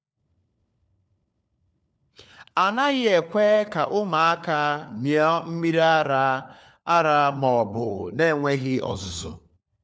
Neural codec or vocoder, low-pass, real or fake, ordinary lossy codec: codec, 16 kHz, 4 kbps, FunCodec, trained on LibriTTS, 50 frames a second; none; fake; none